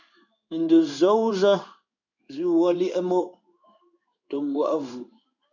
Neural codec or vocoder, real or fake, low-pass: codec, 16 kHz in and 24 kHz out, 1 kbps, XY-Tokenizer; fake; 7.2 kHz